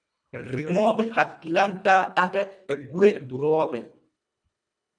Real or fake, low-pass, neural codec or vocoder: fake; 9.9 kHz; codec, 24 kHz, 1.5 kbps, HILCodec